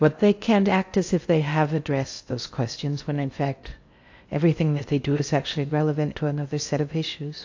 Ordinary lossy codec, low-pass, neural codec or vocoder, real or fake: AAC, 48 kbps; 7.2 kHz; codec, 16 kHz in and 24 kHz out, 0.6 kbps, FocalCodec, streaming, 2048 codes; fake